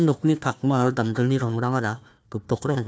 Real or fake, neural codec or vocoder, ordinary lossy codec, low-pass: fake; codec, 16 kHz, 1 kbps, FunCodec, trained on Chinese and English, 50 frames a second; none; none